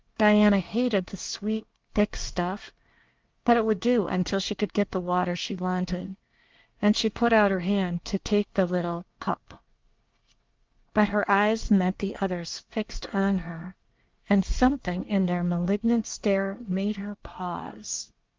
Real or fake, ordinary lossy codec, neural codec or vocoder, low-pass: fake; Opus, 32 kbps; codec, 24 kHz, 1 kbps, SNAC; 7.2 kHz